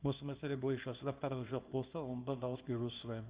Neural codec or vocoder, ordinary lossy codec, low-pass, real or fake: codec, 24 kHz, 0.9 kbps, WavTokenizer, small release; Opus, 32 kbps; 3.6 kHz; fake